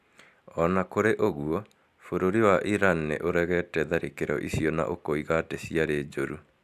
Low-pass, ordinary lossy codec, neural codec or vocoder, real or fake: 14.4 kHz; MP3, 96 kbps; none; real